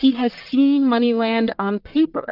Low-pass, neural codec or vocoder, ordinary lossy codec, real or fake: 5.4 kHz; codec, 44.1 kHz, 1.7 kbps, Pupu-Codec; Opus, 24 kbps; fake